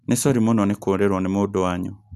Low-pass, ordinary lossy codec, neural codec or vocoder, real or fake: 14.4 kHz; none; none; real